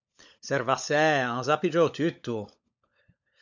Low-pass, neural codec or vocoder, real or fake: 7.2 kHz; codec, 16 kHz, 16 kbps, FunCodec, trained on LibriTTS, 50 frames a second; fake